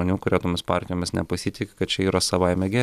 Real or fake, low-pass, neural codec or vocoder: real; 14.4 kHz; none